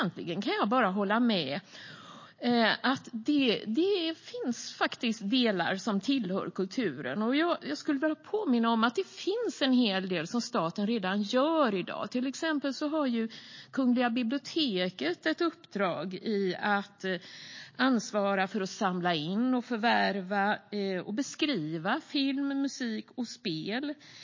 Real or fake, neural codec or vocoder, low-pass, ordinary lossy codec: real; none; 7.2 kHz; MP3, 32 kbps